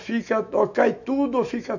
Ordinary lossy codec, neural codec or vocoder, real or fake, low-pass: none; autoencoder, 48 kHz, 128 numbers a frame, DAC-VAE, trained on Japanese speech; fake; 7.2 kHz